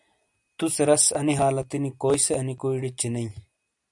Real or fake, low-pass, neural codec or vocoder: real; 10.8 kHz; none